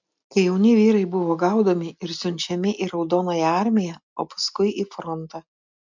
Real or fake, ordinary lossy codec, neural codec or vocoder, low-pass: real; MP3, 64 kbps; none; 7.2 kHz